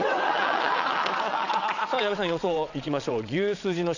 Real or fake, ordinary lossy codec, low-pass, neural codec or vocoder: fake; none; 7.2 kHz; vocoder, 22.05 kHz, 80 mel bands, WaveNeXt